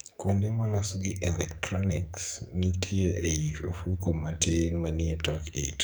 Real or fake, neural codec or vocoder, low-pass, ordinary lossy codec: fake; codec, 44.1 kHz, 2.6 kbps, SNAC; none; none